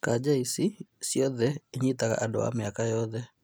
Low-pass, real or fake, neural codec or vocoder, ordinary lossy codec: none; real; none; none